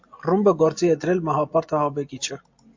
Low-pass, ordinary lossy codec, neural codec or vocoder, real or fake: 7.2 kHz; MP3, 48 kbps; none; real